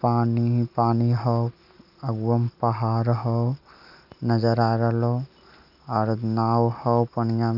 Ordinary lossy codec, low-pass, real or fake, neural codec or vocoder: none; 5.4 kHz; real; none